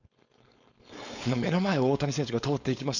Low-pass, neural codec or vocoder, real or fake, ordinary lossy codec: 7.2 kHz; codec, 16 kHz, 4.8 kbps, FACodec; fake; none